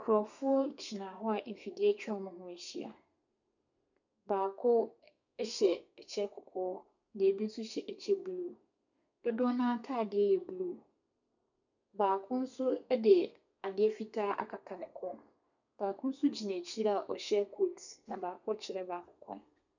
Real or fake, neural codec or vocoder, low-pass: fake; codec, 32 kHz, 1.9 kbps, SNAC; 7.2 kHz